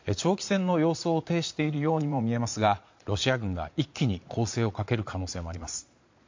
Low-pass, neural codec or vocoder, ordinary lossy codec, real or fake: 7.2 kHz; vocoder, 22.05 kHz, 80 mel bands, Vocos; MP3, 48 kbps; fake